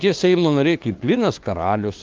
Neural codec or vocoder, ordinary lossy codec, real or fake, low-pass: codec, 16 kHz, 2 kbps, FunCodec, trained on LibriTTS, 25 frames a second; Opus, 32 kbps; fake; 7.2 kHz